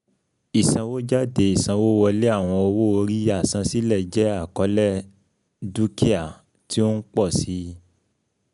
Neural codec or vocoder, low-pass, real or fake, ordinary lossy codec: none; 10.8 kHz; real; none